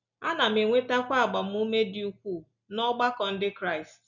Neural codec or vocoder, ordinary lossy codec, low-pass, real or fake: none; none; 7.2 kHz; real